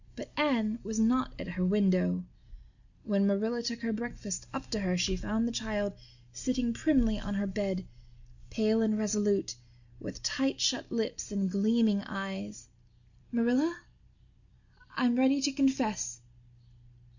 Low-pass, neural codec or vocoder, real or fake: 7.2 kHz; none; real